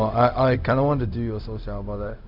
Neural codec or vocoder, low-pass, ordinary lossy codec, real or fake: codec, 16 kHz, 0.4 kbps, LongCat-Audio-Codec; 5.4 kHz; none; fake